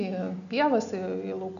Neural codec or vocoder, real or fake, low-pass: none; real; 7.2 kHz